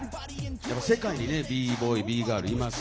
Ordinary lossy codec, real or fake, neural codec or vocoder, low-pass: none; real; none; none